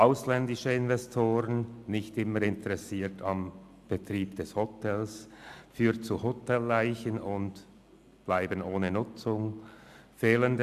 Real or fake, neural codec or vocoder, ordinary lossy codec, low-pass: real; none; AAC, 96 kbps; 14.4 kHz